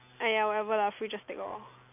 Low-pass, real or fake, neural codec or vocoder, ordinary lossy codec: 3.6 kHz; real; none; none